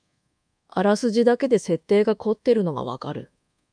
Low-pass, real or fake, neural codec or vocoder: 9.9 kHz; fake; codec, 24 kHz, 1.2 kbps, DualCodec